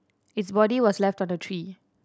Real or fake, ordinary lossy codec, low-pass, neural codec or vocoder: real; none; none; none